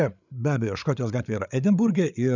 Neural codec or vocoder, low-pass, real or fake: codec, 16 kHz, 8 kbps, FreqCodec, larger model; 7.2 kHz; fake